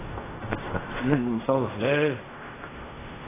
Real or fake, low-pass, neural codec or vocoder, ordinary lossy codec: fake; 3.6 kHz; codec, 16 kHz in and 24 kHz out, 0.4 kbps, LongCat-Audio-Codec, fine tuned four codebook decoder; AAC, 16 kbps